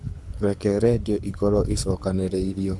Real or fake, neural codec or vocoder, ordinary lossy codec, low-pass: fake; codec, 24 kHz, 6 kbps, HILCodec; none; none